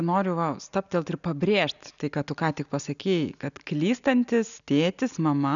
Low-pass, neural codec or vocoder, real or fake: 7.2 kHz; none; real